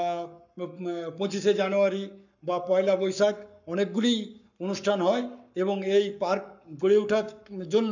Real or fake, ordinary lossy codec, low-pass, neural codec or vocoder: fake; none; 7.2 kHz; codec, 44.1 kHz, 7.8 kbps, Pupu-Codec